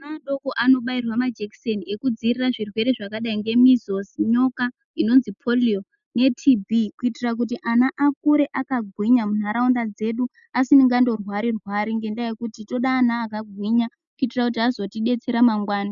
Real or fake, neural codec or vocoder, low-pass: real; none; 7.2 kHz